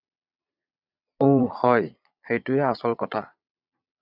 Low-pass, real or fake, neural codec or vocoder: 5.4 kHz; fake; vocoder, 22.05 kHz, 80 mel bands, Vocos